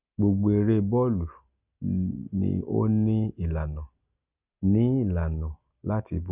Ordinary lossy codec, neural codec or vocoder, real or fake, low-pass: none; none; real; 3.6 kHz